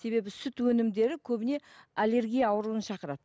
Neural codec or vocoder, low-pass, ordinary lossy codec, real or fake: none; none; none; real